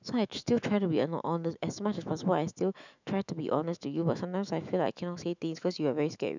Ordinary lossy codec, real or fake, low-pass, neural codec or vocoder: none; fake; 7.2 kHz; autoencoder, 48 kHz, 128 numbers a frame, DAC-VAE, trained on Japanese speech